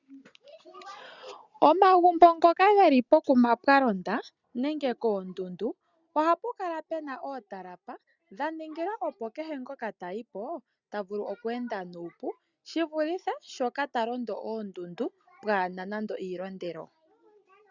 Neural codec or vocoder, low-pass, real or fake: none; 7.2 kHz; real